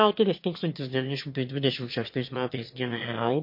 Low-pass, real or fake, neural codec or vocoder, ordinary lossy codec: 5.4 kHz; fake; autoencoder, 22.05 kHz, a latent of 192 numbers a frame, VITS, trained on one speaker; MP3, 32 kbps